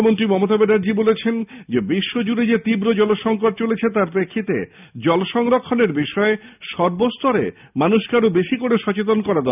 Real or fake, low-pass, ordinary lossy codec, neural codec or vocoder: real; 3.6 kHz; none; none